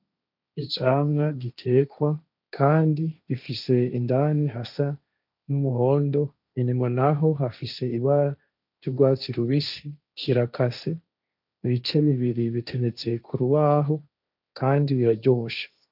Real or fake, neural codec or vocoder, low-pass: fake; codec, 16 kHz, 1.1 kbps, Voila-Tokenizer; 5.4 kHz